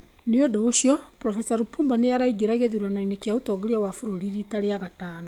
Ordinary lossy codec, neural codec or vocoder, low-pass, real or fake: none; codec, 44.1 kHz, 7.8 kbps, DAC; 19.8 kHz; fake